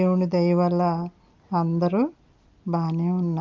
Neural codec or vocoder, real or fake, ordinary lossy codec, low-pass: none; real; Opus, 24 kbps; 7.2 kHz